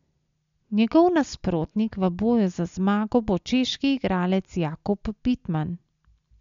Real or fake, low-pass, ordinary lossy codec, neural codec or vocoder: real; 7.2 kHz; MP3, 64 kbps; none